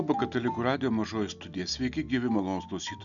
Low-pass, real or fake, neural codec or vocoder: 7.2 kHz; real; none